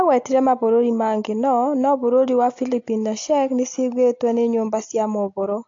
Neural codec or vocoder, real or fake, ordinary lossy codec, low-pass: none; real; AAC, 48 kbps; 7.2 kHz